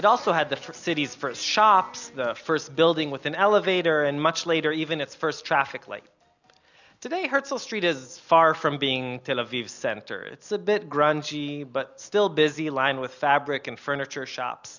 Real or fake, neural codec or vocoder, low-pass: real; none; 7.2 kHz